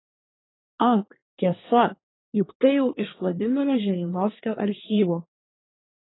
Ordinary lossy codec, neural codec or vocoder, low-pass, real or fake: AAC, 16 kbps; codec, 24 kHz, 1 kbps, SNAC; 7.2 kHz; fake